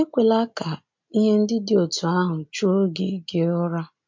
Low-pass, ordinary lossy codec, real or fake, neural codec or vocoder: 7.2 kHz; MP3, 48 kbps; real; none